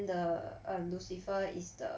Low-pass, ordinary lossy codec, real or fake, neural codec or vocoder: none; none; real; none